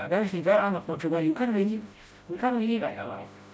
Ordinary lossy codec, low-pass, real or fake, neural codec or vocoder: none; none; fake; codec, 16 kHz, 0.5 kbps, FreqCodec, smaller model